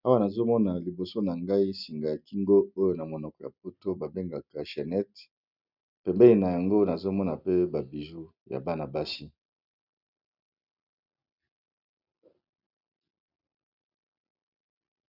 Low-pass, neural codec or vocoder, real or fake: 5.4 kHz; none; real